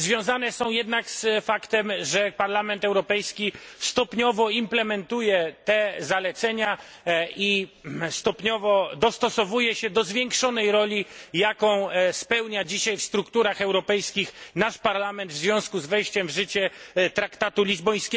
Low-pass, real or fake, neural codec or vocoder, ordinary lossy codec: none; real; none; none